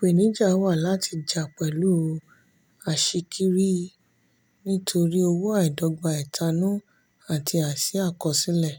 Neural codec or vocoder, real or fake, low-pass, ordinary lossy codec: none; real; none; none